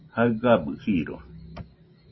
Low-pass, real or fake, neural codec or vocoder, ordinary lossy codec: 7.2 kHz; real; none; MP3, 24 kbps